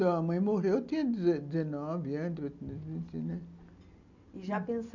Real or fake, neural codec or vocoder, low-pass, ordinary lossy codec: real; none; 7.2 kHz; none